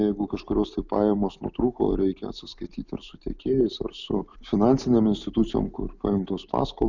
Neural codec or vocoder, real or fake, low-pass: none; real; 7.2 kHz